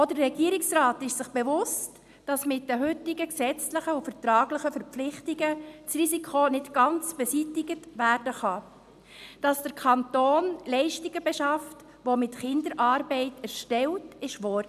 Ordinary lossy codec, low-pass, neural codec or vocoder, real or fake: none; 14.4 kHz; none; real